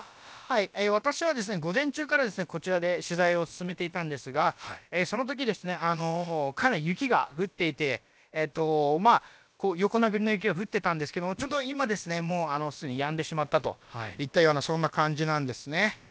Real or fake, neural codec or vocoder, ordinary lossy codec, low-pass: fake; codec, 16 kHz, about 1 kbps, DyCAST, with the encoder's durations; none; none